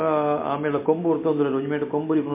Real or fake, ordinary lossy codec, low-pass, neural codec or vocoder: real; MP3, 24 kbps; 3.6 kHz; none